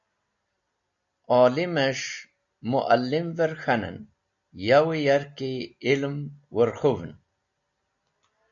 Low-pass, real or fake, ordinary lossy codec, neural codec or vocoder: 7.2 kHz; real; MP3, 96 kbps; none